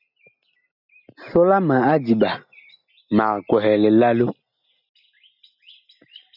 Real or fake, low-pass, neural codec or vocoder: real; 5.4 kHz; none